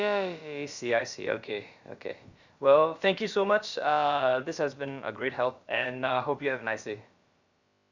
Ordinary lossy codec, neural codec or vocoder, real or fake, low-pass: Opus, 64 kbps; codec, 16 kHz, about 1 kbps, DyCAST, with the encoder's durations; fake; 7.2 kHz